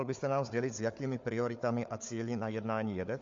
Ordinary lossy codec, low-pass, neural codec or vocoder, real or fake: MP3, 48 kbps; 7.2 kHz; codec, 16 kHz, 4 kbps, FunCodec, trained on Chinese and English, 50 frames a second; fake